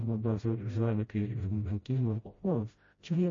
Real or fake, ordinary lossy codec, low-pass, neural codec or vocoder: fake; MP3, 32 kbps; 7.2 kHz; codec, 16 kHz, 0.5 kbps, FreqCodec, smaller model